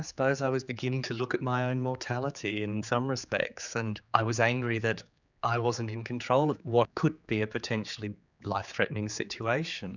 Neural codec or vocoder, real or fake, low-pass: codec, 16 kHz, 4 kbps, X-Codec, HuBERT features, trained on general audio; fake; 7.2 kHz